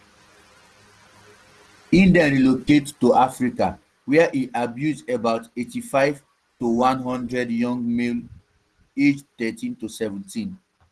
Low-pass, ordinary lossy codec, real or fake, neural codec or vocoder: 10.8 kHz; Opus, 16 kbps; real; none